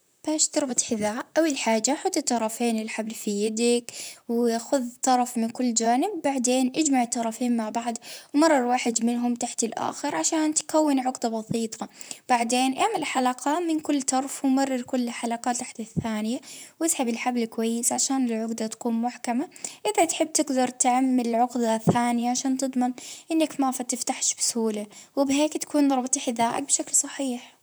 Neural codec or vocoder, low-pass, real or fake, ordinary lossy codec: vocoder, 44.1 kHz, 128 mel bands, Pupu-Vocoder; none; fake; none